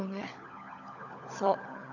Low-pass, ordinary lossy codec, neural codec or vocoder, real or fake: 7.2 kHz; none; codec, 16 kHz, 16 kbps, FunCodec, trained on LibriTTS, 50 frames a second; fake